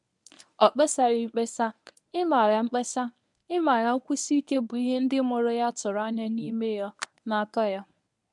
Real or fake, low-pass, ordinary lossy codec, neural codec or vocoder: fake; 10.8 kHz; none; codec, 24 kHz, 0.9 kbps, WavTokenizer, medium speech release version 1